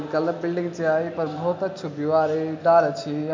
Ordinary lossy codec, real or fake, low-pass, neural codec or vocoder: MP3, 48 kbps; real; 7.2 kHz; none